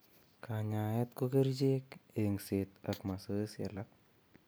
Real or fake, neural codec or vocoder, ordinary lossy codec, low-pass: real; none; none; none